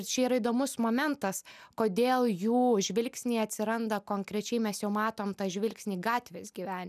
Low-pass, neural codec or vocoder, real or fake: 14.4 kHz; none; real